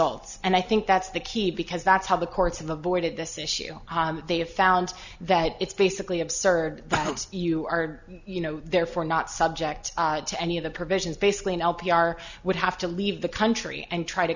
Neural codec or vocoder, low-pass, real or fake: none; 7.2 kHz; real